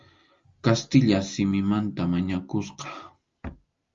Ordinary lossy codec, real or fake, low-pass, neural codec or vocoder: Opus, 32 kbps; real; 7.2 kHz; none